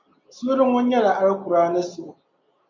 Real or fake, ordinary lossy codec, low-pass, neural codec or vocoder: real; MP3, 64 kbps; 7.2 kHz; none